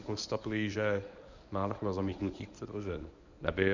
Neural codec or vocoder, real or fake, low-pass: codec, 24 kHz, 0.9 kbps, WavTokenizer, medium speech release version 1; fake; 7.2 kHz